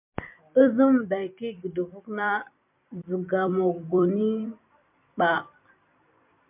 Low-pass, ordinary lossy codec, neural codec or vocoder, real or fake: 3.6 kHz; MP3, 32 kbps; vocoder, 44.1 kHz, 128 mel bands every 256 samples, BigVGAN v2; fake